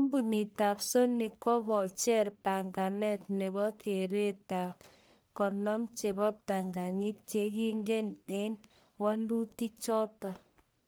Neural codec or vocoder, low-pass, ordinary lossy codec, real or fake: codec, 44.1 kHz, 1.7 kbps, Pupu-Codec; none; none; fake